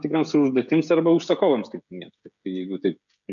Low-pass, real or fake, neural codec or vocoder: 7.2 kHz; fake; codec, 16 kHz, 16 kbps, FreqCodec, smaller model